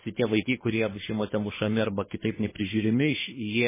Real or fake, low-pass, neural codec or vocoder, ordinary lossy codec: fake; 3.6 kHz; codec, 24 kHz, 3 kbps, HILCodec; MP3, 16 kbps